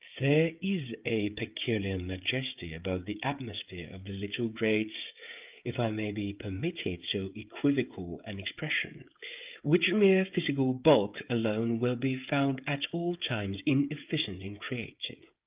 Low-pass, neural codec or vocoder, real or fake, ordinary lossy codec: 3.6 kHz; codec, 16 kHz, 16 kbps, FreqCodec, smaller model; fake; Opus, 24 kbps